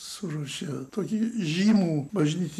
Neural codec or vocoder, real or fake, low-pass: none; real; 14.4 kHz